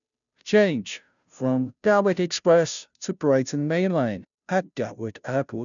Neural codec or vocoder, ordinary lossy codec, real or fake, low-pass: codec, 16 kHz, 0.5 kbps, FunCodec, trained on Chinese and English, 25 frames a second; none; fake; 7.2 kHz